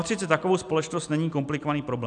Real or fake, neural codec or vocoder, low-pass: real; none; 9.9 kHz